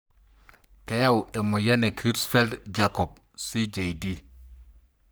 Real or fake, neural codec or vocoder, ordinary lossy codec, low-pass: fake; codec, 44.1 kHz, 3.4 kbps, Pupu-Codec; none; none